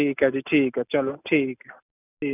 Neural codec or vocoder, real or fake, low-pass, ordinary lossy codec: none; real; 3.6 kHz; none